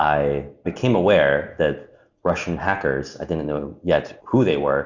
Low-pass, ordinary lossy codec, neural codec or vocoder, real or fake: 7.2 kHz; Opus, 64 kbps; none; real